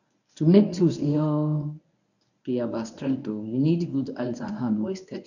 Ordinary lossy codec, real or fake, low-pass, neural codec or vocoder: none; fake; 7.2 kHz; codec, 24 kHz, 0.9 kbps, WavTokenizer, medium speech release version 1